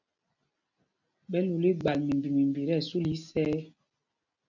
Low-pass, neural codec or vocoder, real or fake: 7.2 kHz; none; real